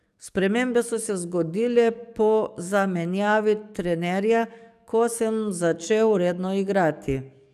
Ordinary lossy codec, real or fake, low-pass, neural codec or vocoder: none; fake; 14.4 kHz; codec, 44.1 kHz, 7.8 kbps, DAC